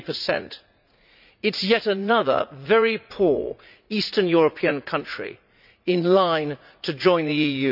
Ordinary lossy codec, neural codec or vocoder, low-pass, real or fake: none; vocoder, 44.1 kHz, 80 mel bands, Vocos; 5.4 kHz; fake